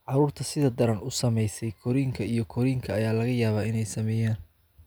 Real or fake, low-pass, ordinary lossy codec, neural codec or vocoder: real; none; none; none